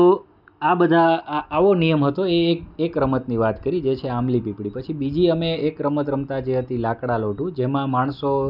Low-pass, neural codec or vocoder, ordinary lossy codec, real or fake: 5.4 kHz; none; none; real